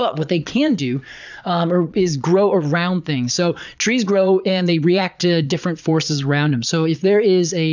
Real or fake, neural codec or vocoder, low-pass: fake; vocoder, 22.05 kHz, 80 mel bands, Vocos; 7.2 kHz